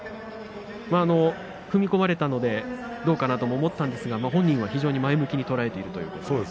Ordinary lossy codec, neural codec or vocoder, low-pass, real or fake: none; none; none; real